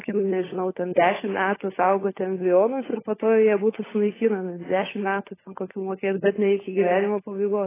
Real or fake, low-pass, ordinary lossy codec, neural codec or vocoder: fake; 3.6 kHz; AAC, 16 kbps; codec, 16 kHz, 16 kbps, FunCodec, trained on LibriTTS, 50 frames a second